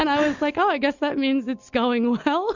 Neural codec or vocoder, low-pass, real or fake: none; 7.2 kHz; real